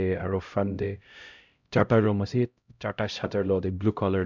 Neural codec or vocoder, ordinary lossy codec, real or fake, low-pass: codec, 16 kHz, 0.5 kbps, X-Codec, HuBERT features, trained on LibriSpeech; none; fake; 7.2 kHz